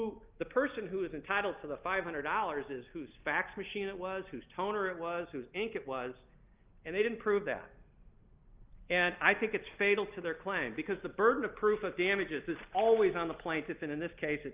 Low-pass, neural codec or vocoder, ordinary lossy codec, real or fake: 3.6 kHz; none; Opus, 24 kbps; real